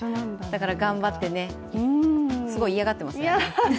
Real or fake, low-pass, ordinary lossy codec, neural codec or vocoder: real; none; none; none